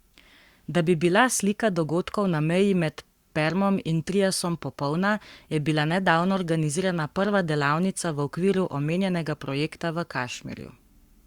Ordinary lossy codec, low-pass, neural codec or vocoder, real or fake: Opus, 64 kbps; 19.8 kHz; codec, 44.1 kHz, 7.8 kbps, Pupu-Codec; fake